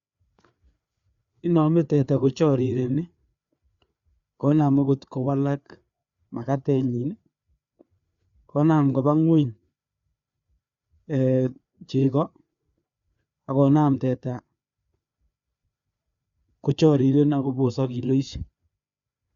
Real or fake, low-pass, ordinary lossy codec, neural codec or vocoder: fake; 7.2 kHz; Opus, 64 kbps; codec, 16 kHz, 4 kbps, FreqCodec, larger model